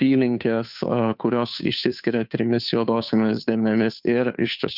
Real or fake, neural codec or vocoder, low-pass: fake; codec, 16 kHz, 2 kbps, FunCodec, trained on Chinese and English, 25 frames a second; 5.4 kHz